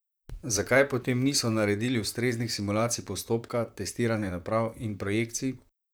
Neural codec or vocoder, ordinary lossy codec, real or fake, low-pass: vocoder, 44.1 kHz, 128 mel bands, Pupu-Vocoder; none; fake; none